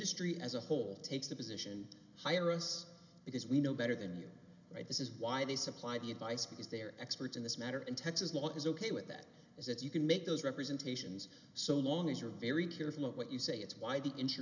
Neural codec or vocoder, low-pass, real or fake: none; 7.2 kHz; real